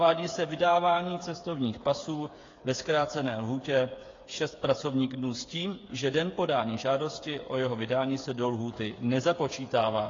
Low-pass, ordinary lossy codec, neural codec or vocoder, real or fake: 7.2 kHz; AAC, 32 kbps; codec, 16 kHz, 8 kbps, FreqCodec, smaller model; fake